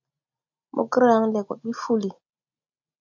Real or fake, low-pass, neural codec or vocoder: real; 7.2 kHz; none